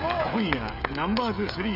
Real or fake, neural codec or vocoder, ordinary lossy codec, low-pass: fake; codec, 16 kHz, 16 kbps, FreqCodec, smaller model; none; 5.4 kHz